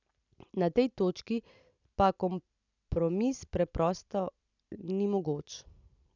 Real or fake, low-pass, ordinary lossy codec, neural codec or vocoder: real; 7.2 kHz; none; none